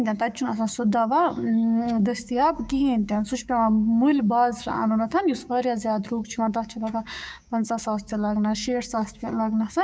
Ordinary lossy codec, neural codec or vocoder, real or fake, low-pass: none; codec, 16 kHz, 6 kbps, DAC; fake; none